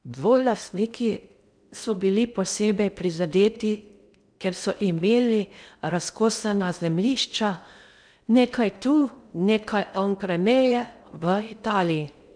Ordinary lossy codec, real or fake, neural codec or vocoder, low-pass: none; fake; codec, 16 kHz in and 24 kHz out, 0.6 kbps, FocalCodec, streaming, 2048 codes; 9.9 kHz